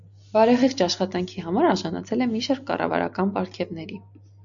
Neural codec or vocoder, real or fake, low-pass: none; real; 7.2 kHz